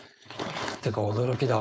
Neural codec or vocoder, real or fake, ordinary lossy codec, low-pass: codec, 16 kHz, 4.8 kbps, FACodec; fake; none; none